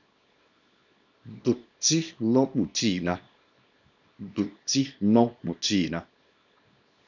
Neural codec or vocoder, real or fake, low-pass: codec, 24 kHz, 0.9 kbps, WavTokenizer, small release; fake; 7.2 kHz